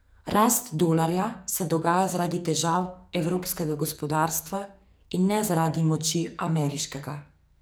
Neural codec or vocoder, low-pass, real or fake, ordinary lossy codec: codec, 44.1 kHz, 2.6 kbps, SNAC; none; fake; none